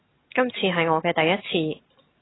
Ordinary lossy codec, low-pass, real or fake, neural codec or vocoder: AAC, 16 kbps; 7.2 kHz; real; none